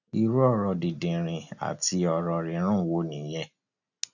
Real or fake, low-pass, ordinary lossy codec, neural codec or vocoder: real; 7.2 kHz; none; none